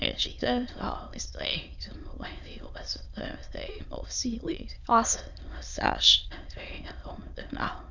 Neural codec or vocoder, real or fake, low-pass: autoencoder, 22.05 kHz, a latent of 192 numbers a frame, VITS, trained on many speakers; fake; 7.2 kHz